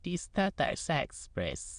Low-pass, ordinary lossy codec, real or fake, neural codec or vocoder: 9.9 kHz; MP3, 64 kbps; fake; autoencoder, 22.05 kHz, a latent of 192 numbers a frame, VITS, trained on many speakers